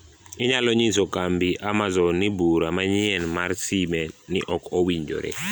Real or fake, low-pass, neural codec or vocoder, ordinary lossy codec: real; none; none; none